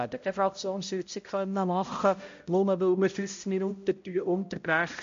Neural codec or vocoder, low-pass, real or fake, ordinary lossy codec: codec, 16 kHz, 0.5 kbps, X-Codec, HuBERT features, trained on balanced general audio; 7.2 kHz; fake; MP3, 48 kbps